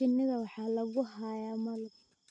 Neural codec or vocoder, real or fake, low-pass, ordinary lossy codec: none; real; 9.9 kHz; none